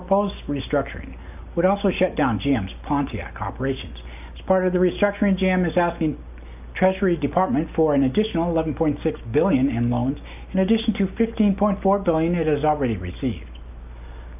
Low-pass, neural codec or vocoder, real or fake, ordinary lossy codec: 3.6 kHz; none; real; AAC, 32 kbps